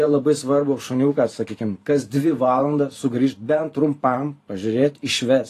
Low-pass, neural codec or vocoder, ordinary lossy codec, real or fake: 14.4 kHz; vocoder, 44.1 kHz, 128 mel bands every 512 samples, BigVGAN v2; AAC, 48 kbps; fake